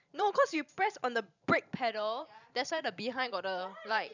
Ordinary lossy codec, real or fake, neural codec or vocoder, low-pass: none; fake; vocoder, 44.1 kHz, 128 mel bands every 512 samples, BigVGAN v2; 7.2 kHz